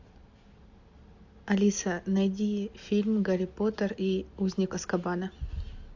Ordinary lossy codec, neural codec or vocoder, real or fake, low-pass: AAC, 48 kbps; none; real; 7.2 kHz